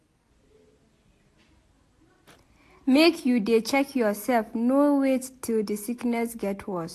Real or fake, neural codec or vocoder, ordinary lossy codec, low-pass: real; none; AAC, 64 kbps; 14.4 kHz